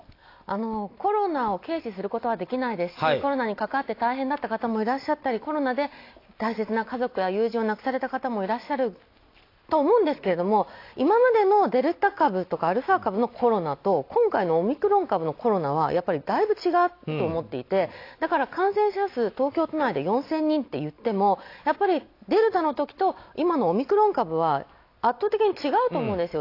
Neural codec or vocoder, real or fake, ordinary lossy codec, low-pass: none; real; AAC, 32 kbps; 5.4 kHz